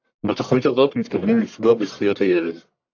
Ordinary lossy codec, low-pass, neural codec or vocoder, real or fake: AAC, 48 kbps; 7.2 kHz; codec, 44.1 kHz, 1.7 kbps, Pupu-Codec; fake